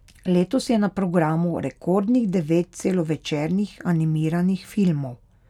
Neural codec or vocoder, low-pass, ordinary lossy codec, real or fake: none; 19.8 kHz; none; real